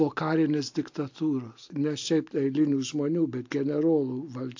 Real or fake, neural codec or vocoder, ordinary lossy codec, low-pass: real; none; AAC, 48 kbps; 7.2 kHz